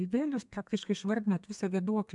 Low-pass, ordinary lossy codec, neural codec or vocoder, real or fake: 10.8 kHz; MP3, 96 kbps; codec, 32 kHz, 1.9 kbps, SNAC; fake